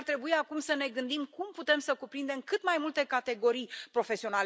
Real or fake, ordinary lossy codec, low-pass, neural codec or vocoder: real; none; none; none